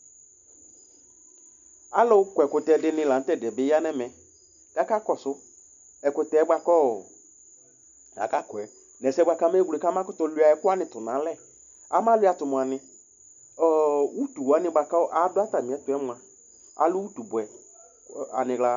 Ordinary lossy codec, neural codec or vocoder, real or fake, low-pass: MP3, 96 kbps; none; real; 7.2 kHz